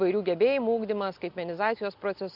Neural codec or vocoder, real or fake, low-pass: none; real; 5.4 kHz